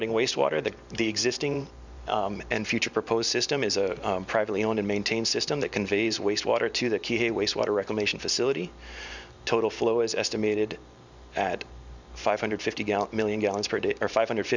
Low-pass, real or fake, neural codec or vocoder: 7.2 kHz; fake; vocoder, 44.1 kHz, 128 mel bands every 512 samples, BigVGAN v2